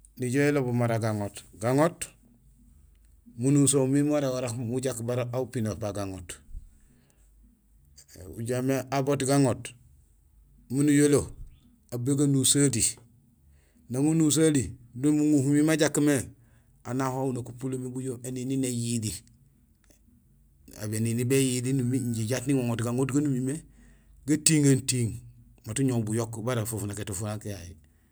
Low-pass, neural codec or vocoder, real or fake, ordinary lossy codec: none; none; real; none